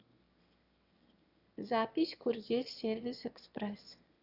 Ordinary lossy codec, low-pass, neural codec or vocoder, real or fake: Opus, 64 kbps; 5.4 kHz; autoencoder, 22.05 kHz, a latent of 192 numbers a frame, VITS, trained on one speaker; fake